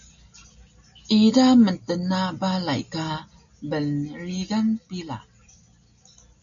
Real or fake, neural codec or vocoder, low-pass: real; none; 7.2 kHz